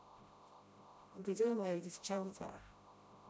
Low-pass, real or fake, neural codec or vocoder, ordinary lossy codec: none; fake; codec, 16 kHz, 0.5 kbps, FreqCodec, smaller model; none